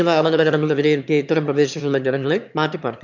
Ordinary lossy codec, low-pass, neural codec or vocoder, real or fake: none; 7.2 kHz; autoencoder, 22.05 kHz, a latent of 192 numbers a frame, VITS, trained on one speaker; fake